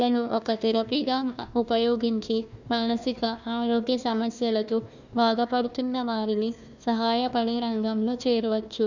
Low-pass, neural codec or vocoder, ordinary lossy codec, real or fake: 7.2 kHz; codec, 16 kHz, 1 kbps, FunCodec, trained on Chinese and English, 50 frames a second; none; fake